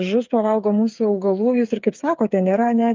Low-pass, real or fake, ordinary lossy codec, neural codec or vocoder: 7.2 kHz; fake; Opus, 32 kbps; codec, 16 kHz, 8 kbps, FreqCodec, smaller model